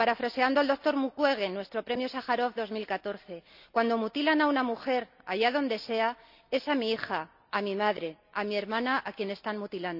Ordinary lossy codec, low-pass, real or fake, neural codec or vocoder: none; 5.4 kHz; real; none